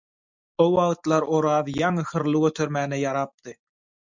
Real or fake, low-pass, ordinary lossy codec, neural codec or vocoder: real; 7.2 kHz; MP3, 64 kbps; none